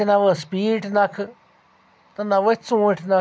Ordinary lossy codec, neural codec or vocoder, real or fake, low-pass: none; none; real; none